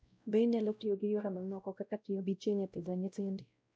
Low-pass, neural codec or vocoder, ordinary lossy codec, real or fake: none; codec, 16 kHz, 0.5 kbps, X-Codec, WavLM features, trained on Multilingual LibriSpeech; none; fake